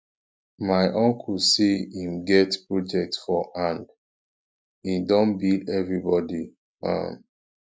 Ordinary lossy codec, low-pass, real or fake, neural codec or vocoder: none; none; real; none